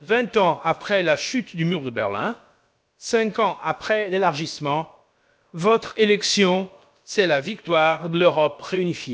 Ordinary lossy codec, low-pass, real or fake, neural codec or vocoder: none; none; fake; codec, 16 kHz, about 1 kbps, DyCAST, with the encoder's durations